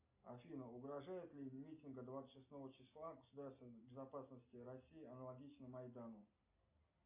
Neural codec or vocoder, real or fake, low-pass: none; real; 3.6 kHz